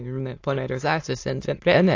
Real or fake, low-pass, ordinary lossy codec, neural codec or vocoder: fake; 7.2 kHz; AAC, 48 kbps; autoencoder, 22.05 kHz, a latent of 192 numbers a frame, VITS, trained on many speakers